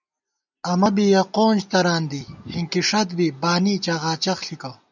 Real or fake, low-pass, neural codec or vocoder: real; 7.2 kHz; none